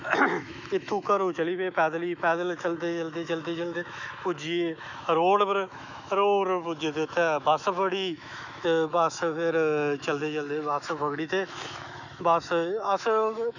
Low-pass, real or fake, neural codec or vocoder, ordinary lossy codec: 7.2 kHz; fake; autoencoder, 48 kHz, 128 numbers a frame, DAC-VAE, trained on Japanese speech; none